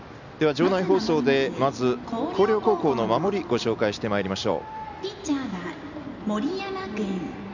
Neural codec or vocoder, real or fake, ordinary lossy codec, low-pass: none; real; none; 7.2 kHz